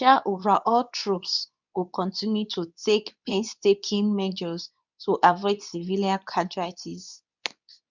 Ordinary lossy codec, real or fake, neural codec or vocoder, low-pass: none; fake; codec, 24 kHz, 0.9 kbps, WavTokenizer, medium speech release version 2; 7.2 kHz